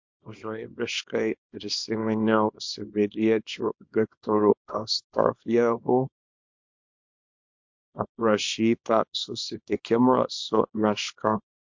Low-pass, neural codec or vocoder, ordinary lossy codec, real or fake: 7.2 kHz; codec, 24 kHz, 0.9 kbps, WavTokenizer, small release; MP3, 48 kbps; fake